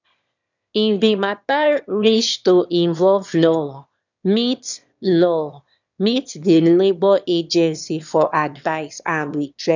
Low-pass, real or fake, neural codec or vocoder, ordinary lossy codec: 7.2 kHz; fake; autoencoder, 22.05 kHz, a latent of 192 numbers a frame, VITS, trained on one speaker; none